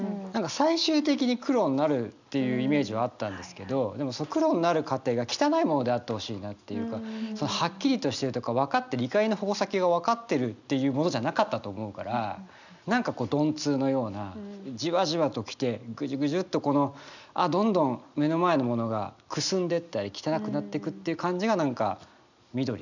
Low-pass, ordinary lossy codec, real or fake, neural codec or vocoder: 7.2 kHz; none; real; none